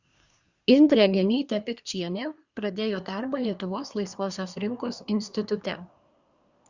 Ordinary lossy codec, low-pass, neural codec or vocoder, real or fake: Opus, 64 kbps; 7.2 kHz; codec, 24 kHz, 1 kbps, SNAC; fake